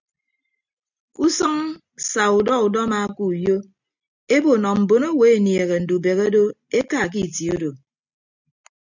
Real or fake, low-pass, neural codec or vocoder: real; 7.2 kHz; none